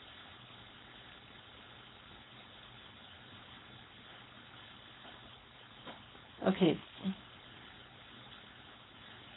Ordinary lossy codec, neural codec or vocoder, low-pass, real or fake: AAC, 16 kbps; codec, 16 kHz, 4.8 kbps, FACodec; 7.2 kHz; fake